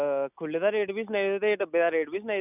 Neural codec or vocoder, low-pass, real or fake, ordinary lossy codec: none; 3.6 kHz; real; none